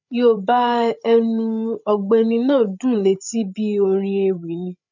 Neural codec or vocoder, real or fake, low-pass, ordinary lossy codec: codec, 16 kHz, 8 kbps, FreqCodec, larger model; fake; 7.2 kHz; none